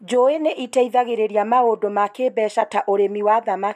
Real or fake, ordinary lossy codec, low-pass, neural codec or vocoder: real; none; 14.4 kHz; none